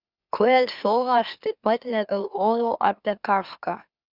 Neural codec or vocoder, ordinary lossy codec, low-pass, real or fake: autoencoder, 44.1 kHz, a latent of 192 numbers a frame, MeloTTS; Opus, 64 kbps; 5.4 kHz; fake